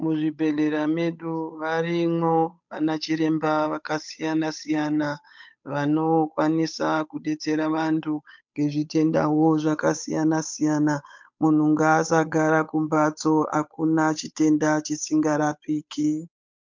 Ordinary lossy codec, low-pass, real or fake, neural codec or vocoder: MP3, 64 kbps; 7.2 kHz; fake; codec, 16 kHz, 8 kbps, FunCodec, trained on Chinese and English, 25 frames a second